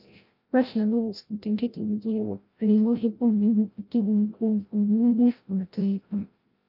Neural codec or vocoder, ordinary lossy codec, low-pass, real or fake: codec, 16 kHz, 0.5 kbps, FreqCodec, larger model; Opus, 24 kbps; 5.4 kHz; fake